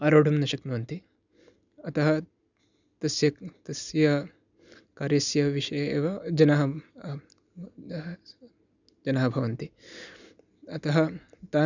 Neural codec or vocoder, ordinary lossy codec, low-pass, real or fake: none; none; 7.2 kHz; real